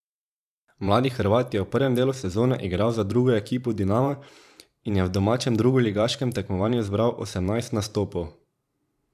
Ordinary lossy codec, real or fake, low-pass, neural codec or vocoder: none; fake; 14.4 kHz; vocoder, 44.1 kHz, 128 mel bands every 512 samples, BigVGAN v2